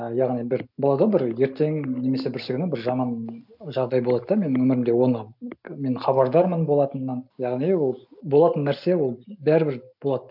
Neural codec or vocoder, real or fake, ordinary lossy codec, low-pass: none; real; none; 5.4 kHz